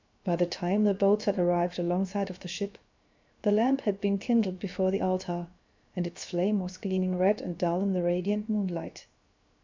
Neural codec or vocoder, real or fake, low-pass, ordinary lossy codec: codec, 16 kHz, 0.7 kbps, FocalCodec; fake; 7.2 kHz; MP3, 64 kbps